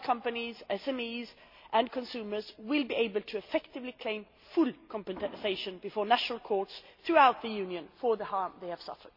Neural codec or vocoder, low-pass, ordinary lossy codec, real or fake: none; 5.4 kHz; MP3, 32 kbps; real